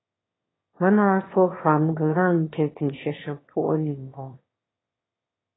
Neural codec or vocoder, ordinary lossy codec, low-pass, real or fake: autoencoder, 22.05 kHz, a latent of 192 numbers a frame, VITS, trained on one speaker; AAC, 16 kbps; 7.2 kHz; fake